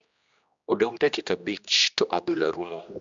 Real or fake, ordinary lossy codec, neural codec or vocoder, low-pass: fake; MP3, 64 kbps; codec, 16 kHz, 1 kbps, X-Codec, HuBERT features, trained on general audio; 7.2 kHz